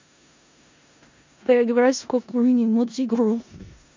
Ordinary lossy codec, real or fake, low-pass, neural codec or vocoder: MP3, 64 kbps; fake; 7.2 kHz; codec, 16 kHz in and 24 kHz out, 0.4 kbps, LongCat-Audio-Codec, four codebook decoder